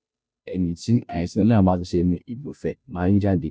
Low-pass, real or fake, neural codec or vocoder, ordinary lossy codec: none; fake; codec, 16 kHz, 0.5 kbps, FunCodec, trained on Chinese and English, 25 frames a second; none